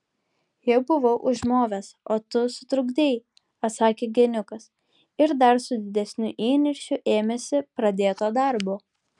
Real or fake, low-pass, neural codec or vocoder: real; 10.8 kHz; none